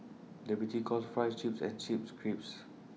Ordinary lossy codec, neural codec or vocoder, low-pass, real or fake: none; none; none; real